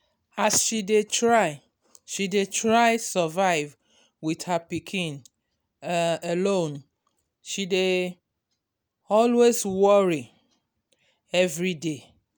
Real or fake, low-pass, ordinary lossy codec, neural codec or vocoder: real; none; none; none